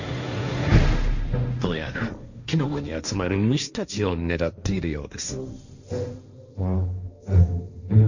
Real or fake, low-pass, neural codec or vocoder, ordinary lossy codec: fake; 7.2 kHz; codec, 16 kHz, 1.1 kbps, Voila-Tokenizer; none